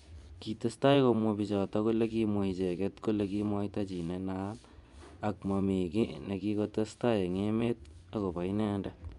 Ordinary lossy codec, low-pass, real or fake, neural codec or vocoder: none; 10.8 kHz; fake; vocoder, 24 kHz, 100 mel bands, Vocos